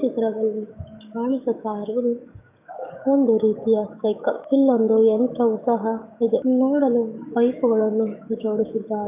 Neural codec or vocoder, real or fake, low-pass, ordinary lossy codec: codec, 44.1 kHz, 7.8 kbps, DAC; fake; 3.6 kHz; none